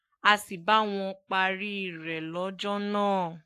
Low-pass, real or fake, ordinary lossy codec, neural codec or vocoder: 14.4 kHz; fake; AAC, 64 kbps; codec, 44.1 kHz, 7.8 kbps, Pupu-Codec